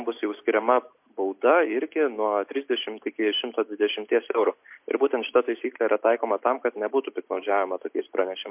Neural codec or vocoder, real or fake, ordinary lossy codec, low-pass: none; real; MP3, 32 kbps; 3.6 kHz